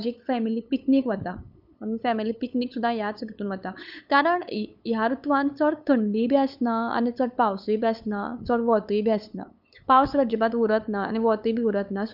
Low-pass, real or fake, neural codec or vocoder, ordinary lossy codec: 5.4 kHz; fake; codec, 16 kHz, 8 kbps, FunCodec, trained on LibriTTS, 25 frames a second; none